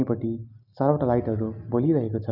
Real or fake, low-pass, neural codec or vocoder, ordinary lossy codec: real; 5.4 kHz; none; none